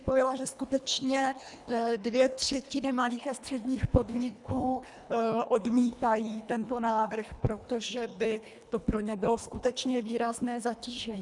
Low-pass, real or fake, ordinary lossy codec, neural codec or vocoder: 10.8 kHz; fake; MP3, 96 kbps; codec, 24 kHz, 1.5 kbps, HILCodec